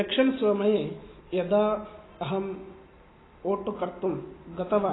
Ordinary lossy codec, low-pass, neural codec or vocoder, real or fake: AAC, 16 kbps; 7.2 kHz; none; real